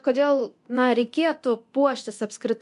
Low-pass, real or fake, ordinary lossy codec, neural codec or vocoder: 10.8 kHz; fake; MP3, 64 kbps; codec, 24 kHz, 0.9 kbps, DualCodec